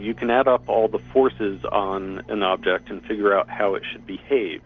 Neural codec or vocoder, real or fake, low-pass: none; real; 7.2 kHz